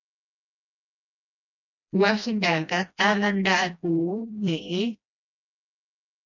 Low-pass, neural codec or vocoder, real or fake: 7.2 kHz; codec, 16 kHz, 1 kbps, FreqCodec, smaller model; fake